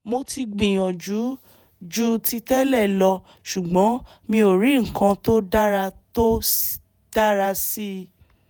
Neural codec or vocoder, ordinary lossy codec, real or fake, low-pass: vocoder, 48 kHz, 128 mel bands, Vocos; none; fake; none